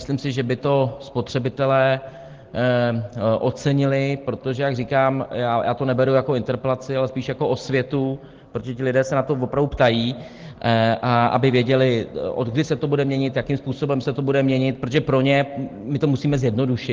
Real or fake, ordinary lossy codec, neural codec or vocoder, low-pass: real; Opus, 16 kbps; none; 7.2 kHz